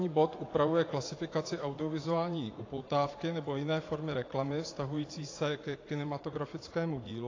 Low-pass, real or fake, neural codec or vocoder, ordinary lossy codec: 7.2 kHz; fake; vocoder, 44.1 kHz, 80 mel bands, Vocos; AAC, 32 kbps